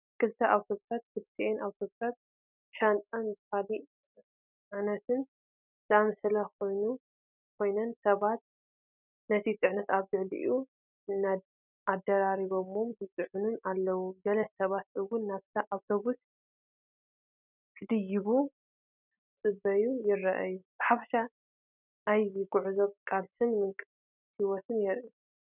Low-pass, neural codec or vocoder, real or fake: 3.6 kHz; none; real